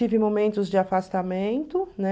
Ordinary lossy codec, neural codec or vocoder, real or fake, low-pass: none; none; real; none